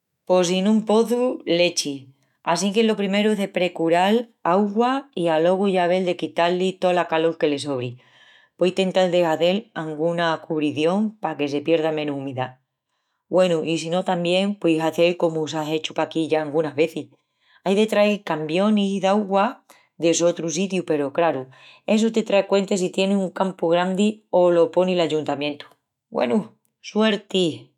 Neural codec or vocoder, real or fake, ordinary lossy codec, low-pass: autoencoder, 48 kHz, 128 numbers a frame, DAC-VAE, trained on Japanese speech; fake; none; 19.8 kHz